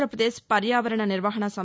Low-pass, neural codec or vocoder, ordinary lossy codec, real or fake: none; none; none; real